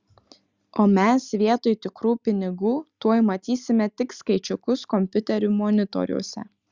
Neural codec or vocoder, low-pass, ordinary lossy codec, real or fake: none; 7.2 kHz; Opus, 64 kbps; real